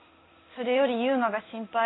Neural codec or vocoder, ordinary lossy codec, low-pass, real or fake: codec, 16 kHz in and 24 kHz out, 1 kbps, XY-Tokenizer; AAC, 16 kbps; 7.2 kHz; fake